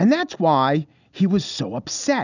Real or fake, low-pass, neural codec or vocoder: real; 7.2 kHz; none